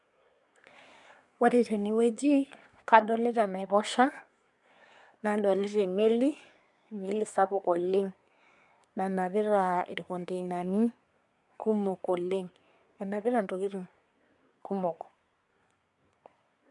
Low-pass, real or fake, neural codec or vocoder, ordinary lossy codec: 10.8 kHz; fake; codec, 24 kHz, 1 kbps, SNAC; none